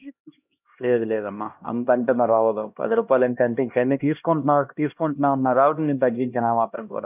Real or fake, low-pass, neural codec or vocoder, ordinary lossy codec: fake; 3.6 kHz; codec, 16 kHz, 1 kbps, X-Codec, HuBERT features, trained on LibriSpeech; none